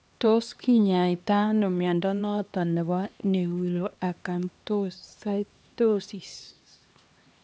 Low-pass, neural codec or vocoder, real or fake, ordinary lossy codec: none; codec, 16 kHz, 2 kbps, X-Codec, HuBERT features, trained on LibriSpeech; fake; none